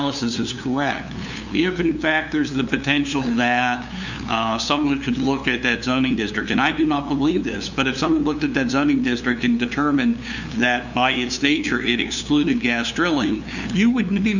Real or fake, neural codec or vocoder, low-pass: fake; codec, 16 kHz, 2 kbps, FunCodec, trained on LibriTTS, 25 frames a second; 7.2 kHz